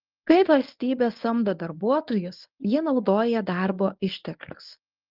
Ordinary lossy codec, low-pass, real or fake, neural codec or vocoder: Opus, 24 kbps; 5.4 kHz; fake; codec, 24 kHz, 0.9 kbps, WavTokenizer, medium speech release version 1